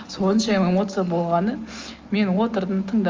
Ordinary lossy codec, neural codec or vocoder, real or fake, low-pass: Opus, 24 kbps; none; real; 7.2 kHz